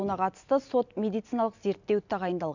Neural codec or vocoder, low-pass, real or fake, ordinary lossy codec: none; 7.2 kHz; real; none